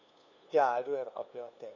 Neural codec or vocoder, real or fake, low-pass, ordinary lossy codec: codec, 16 kHz, 2 kbps, FunCodec, trained on LibriTTS, 25 frames a second; fake; 7.2 kHz; none